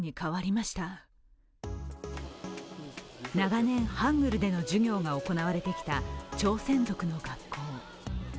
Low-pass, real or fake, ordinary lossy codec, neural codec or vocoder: none; real; none; none